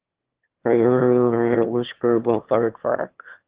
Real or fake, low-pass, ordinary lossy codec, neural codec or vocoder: fake; 3.6 kHz; Opus, 16 kbps; autoencoder, 22.05 kHz, a latent of 192 numbers a frame, VITS, trained on one speaker